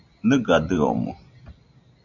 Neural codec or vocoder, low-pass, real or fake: none; 7.2 kHz; real